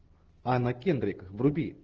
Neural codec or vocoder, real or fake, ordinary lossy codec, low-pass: none; real; Opus, 16 kbps; 7.2 kHz